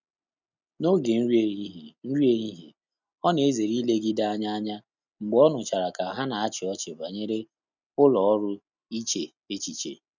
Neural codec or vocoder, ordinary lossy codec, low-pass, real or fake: none; none; 7.2 kHz; real